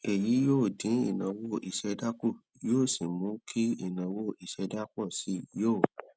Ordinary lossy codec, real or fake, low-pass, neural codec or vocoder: none; real; none; none